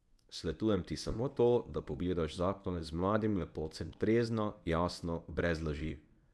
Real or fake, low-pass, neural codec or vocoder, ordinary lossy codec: fake; none; codec, 24 kHz, 0.9 kbps, WavTokenizer, medium speech release version 1; none